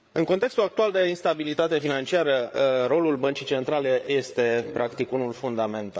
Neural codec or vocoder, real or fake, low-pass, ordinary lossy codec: codec, 16 kHz, 8 kbps, FreqCodec, larger model; fake; none; none